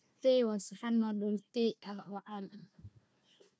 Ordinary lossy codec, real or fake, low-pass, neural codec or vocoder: none; fake; none; codec, 16 kHz, 1 kbps, FunCodec, trained on Chinese and English, 50 frames a second